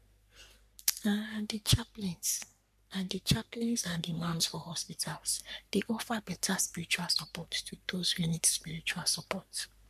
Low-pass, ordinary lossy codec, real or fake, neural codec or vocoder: 14.4 kHz; none; fake; codec, 44.1 kHz, 3.4 kbps, Pupu-Codec